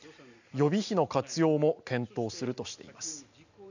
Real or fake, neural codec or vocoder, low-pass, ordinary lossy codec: real; none; 7.2 kHz; none